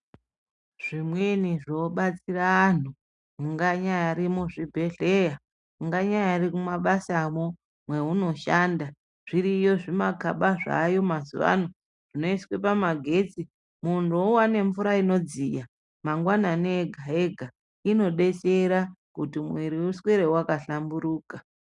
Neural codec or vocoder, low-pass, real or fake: none; 10.8 kHz; real